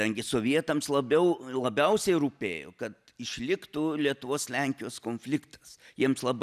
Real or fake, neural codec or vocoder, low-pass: real; none; 14.4 kHz